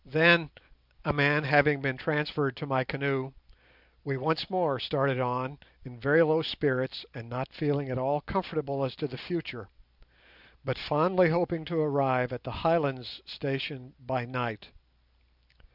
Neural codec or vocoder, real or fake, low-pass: vocoder, 44.1 kHz, 128 mel bands every 256 samples, BigVGAN v2; fake; 5.4 kHz